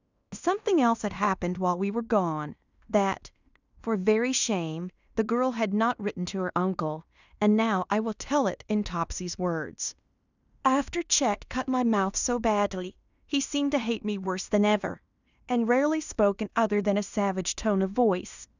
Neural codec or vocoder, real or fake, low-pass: codec, 16 kHz in and 24 kHz out, 0.9 kbps, LongCat-Audio-Codec, fine tuned four codebook decoder; fake; 7.2 kHz